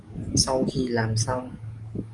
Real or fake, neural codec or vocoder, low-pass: fake; codec, 44.1 kHz, 7.8 kbps, DAC; 10.8 kHz